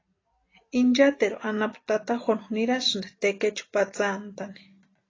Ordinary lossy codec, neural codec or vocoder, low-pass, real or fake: AAC, 32 kbps; none; 7.2 kHz; real